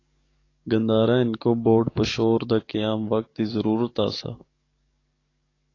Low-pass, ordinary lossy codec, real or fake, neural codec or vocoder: 7.2 kHz; AAC, 32 kbps; fake; codec, 44.1 kHz, 7.8 kbps, DAC